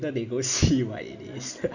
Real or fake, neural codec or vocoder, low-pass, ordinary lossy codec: real; none; 7.2 kHz; none